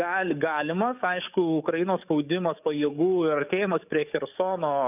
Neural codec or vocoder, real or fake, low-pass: codec, 44.1 kHz, 7.8 kbps, DAC; fake; 3.6 kHz